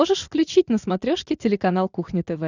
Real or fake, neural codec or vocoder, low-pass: real; none; 7.2 kHz